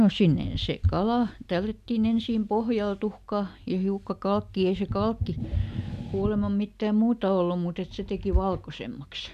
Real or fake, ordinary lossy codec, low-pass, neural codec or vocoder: real; none; 14.4 kHz; none